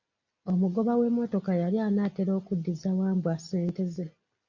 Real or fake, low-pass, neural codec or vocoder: real; 7.2 kHz; none